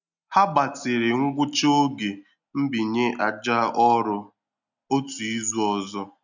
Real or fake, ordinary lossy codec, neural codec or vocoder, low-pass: real; none; none; 7.2 kHz